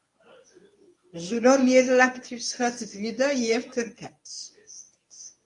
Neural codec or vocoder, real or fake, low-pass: codec, 24 kHz, 0.9 kbps, WavTokenizer, medium speech release version 1; fake; 10.8 kHz